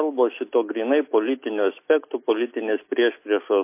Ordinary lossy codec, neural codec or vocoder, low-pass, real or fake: MP3, 24 kbps; none; 3.6 kHz; real